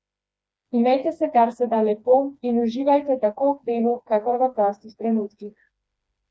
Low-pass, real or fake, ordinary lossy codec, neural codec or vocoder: none; fake; none; codec, 16 kHz, 2 kbps, FreqCodec, smaller model